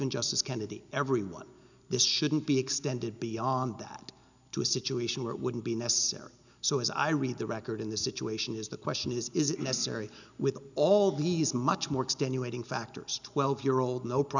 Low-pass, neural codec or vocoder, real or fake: 7.2 kHz; none; real